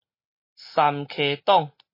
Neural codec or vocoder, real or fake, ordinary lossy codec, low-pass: none; real; MP3, 24 kbps; 5.4 kHz